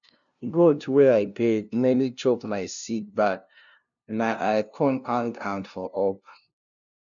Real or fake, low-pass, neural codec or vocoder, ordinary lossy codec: fake; 7.2 kHz; codec, 16 kHz, 0.5 kbps, FunCodec, trained on LibriTTS, 25 frames a second; none